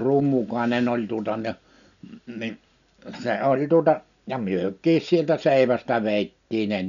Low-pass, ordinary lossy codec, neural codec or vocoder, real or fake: 7.2 kHz; none; none; real